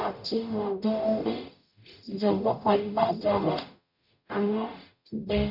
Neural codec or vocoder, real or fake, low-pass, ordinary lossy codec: codec, 44.1 kHz, 0.9 kbps, DAC; fake; 5.4 kHz; none